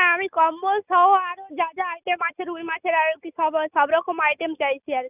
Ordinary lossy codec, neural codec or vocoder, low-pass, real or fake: none; none; 3.6 kHz; real